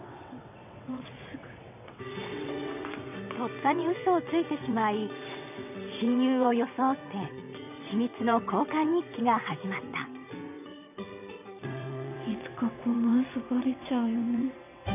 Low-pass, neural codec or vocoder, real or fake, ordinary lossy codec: 3.6 kHz; vocoder, 22.05 kHz, 80 mel bands, WaveNeXt; fake; none